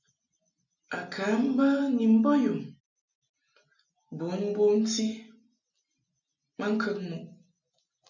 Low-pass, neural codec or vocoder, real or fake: 7.2 kHz; none; real